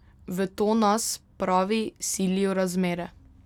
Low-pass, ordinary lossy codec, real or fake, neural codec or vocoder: 19.8 kHz; none; real; none